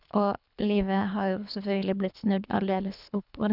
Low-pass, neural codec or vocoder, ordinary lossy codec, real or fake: 5.4 kHz; codec, 24 kHz, 3 kbps, HILCodec; none; fake